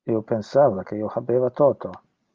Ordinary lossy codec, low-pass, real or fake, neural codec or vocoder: Opus, 24 kbps; 7.2 kHz; real; none